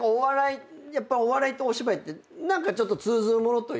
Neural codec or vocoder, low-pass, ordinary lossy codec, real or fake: none; none; none; real